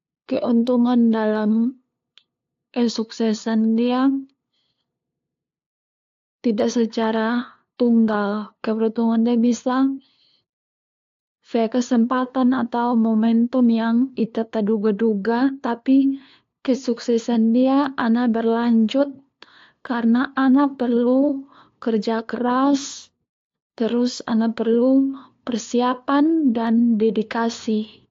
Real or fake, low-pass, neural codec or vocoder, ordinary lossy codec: fake; 7.2 kHz; codec, 16 kHz, 2 kbps, FunCodec, trained on LibriTTS, 25 frames a second; AAC, 48 kbps